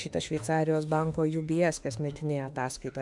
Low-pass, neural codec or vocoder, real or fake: 10.8 kHz; autoencoder, 48 kHz, 32 numbers a frame, DAC-VAE, trained on Japanese speech; fake